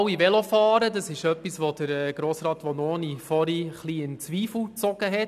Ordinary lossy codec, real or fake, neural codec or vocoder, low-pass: none; real; none; 14.4 kHz